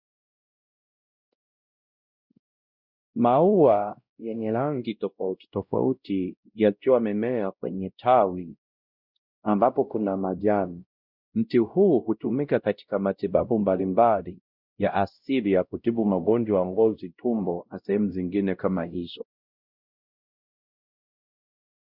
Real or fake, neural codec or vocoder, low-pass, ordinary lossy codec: fake; codec, 16 kHz, 0.5 kbps, X-Codec, WavLM features, trained on Multilingual LibriSpeech; 5.4 kHz; Opus, 64 kbps